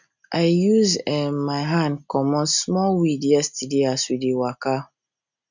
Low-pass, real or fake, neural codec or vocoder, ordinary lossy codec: 7.2 kHz; real; none; none